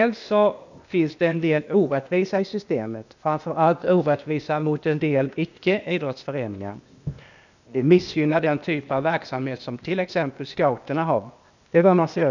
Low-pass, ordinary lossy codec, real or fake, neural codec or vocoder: 7.2 kHz; none; fake; codec, 16 kHz, 0.8 kbps, ZipCodec